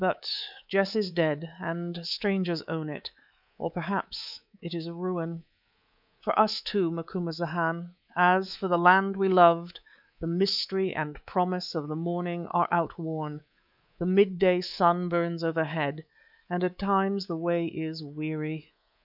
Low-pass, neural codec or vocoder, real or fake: 5.4 kHz; codec, 24 kHz, 3.1 kbps, DualCodec; fake